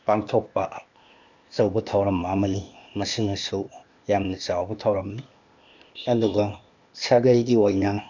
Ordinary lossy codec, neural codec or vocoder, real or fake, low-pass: none; codec, 16 kHz, 0.8 kbps, ZipCodec; fake; 7.2 kHz